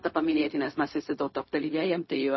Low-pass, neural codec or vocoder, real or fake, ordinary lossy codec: 7.2 kHz; codec, 16 kHz, 0.4 kbps, LongCat-Audio-Codec; fake; MP3, 24 kbps